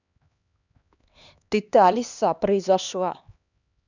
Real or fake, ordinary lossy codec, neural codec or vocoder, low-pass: fake; none; codec, 16 kHz, 2 kbps, X-Codec, HuBERT features, trained on LibriSpeech; 7.2 kHz